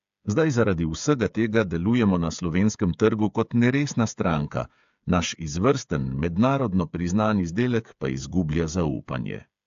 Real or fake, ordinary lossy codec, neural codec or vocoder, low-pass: fake; AAC, 64 kbps; codec, 16 kHz, 8 kbps, FreqCodec, smaller model; 7.2 kHz